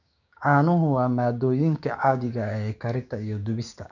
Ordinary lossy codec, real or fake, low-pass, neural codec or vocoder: none; fake; 7.2 kHz; codec, 16 kHz, 6 kbps, DAC